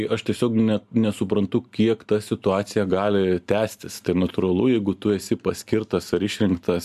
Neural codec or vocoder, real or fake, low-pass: none; real; 14.4 kHz